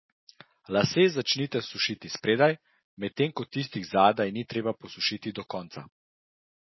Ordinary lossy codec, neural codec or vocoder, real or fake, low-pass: MP3, 24 kbps; none; real; 7.2 kHz